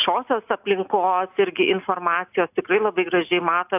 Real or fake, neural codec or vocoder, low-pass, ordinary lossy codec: real; none; 3.6 kHz; AAC, 32 kbps